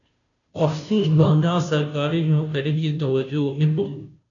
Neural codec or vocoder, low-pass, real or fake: codec, 16 kHz, 0.5 kbps, FunCodec, trained on Chinese and English, 25 frames a second; 7.2 kHz; fake